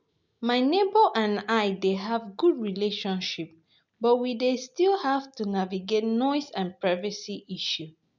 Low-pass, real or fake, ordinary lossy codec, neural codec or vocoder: 7.2 kHz; real; none; none